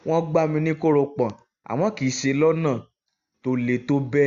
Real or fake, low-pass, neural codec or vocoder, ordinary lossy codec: real; 7.2 kHz; none; Opus, 64 kbps